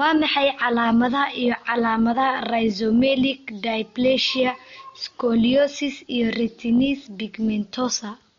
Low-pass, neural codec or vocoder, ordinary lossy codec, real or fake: 7.2 kHz; none; MP3, 48 kbps; real